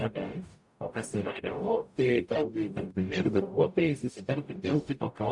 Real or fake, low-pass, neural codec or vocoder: fake; 10.8 kHz; codec, 44.1 kHz, 0.9 kbps, DAC